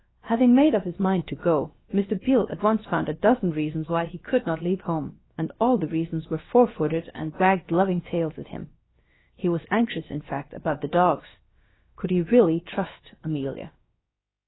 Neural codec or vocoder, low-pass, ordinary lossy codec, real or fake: codec, 16 kHz, about 1 kbps, DyCAST, with the encoder's durations; 7.2 kHz; AAC, 16 kbps; fake